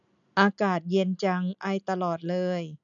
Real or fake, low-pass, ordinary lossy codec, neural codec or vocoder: real; 7.2 kHz; none; none